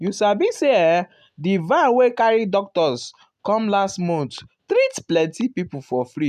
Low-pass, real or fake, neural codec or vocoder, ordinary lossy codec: 14.4 kHz; real; none; none